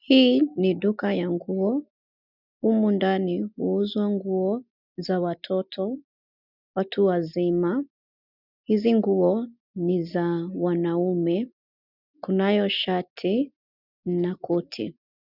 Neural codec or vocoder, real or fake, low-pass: none; real; 5.4 kHz